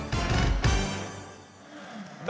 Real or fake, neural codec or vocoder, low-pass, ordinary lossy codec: real; none; none; none